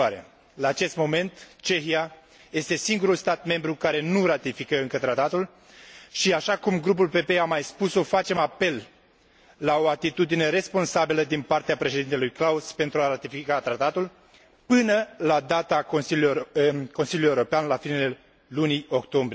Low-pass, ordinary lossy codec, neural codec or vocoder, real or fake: none; none; none; real